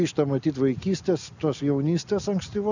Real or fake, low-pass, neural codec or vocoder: real; 7.2 kHz; none